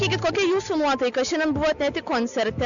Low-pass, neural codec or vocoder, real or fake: 7.2 kHz; none; real